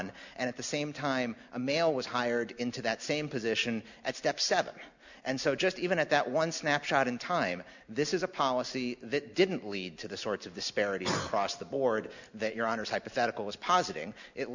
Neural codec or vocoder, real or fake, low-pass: none; real; 7.2 kHz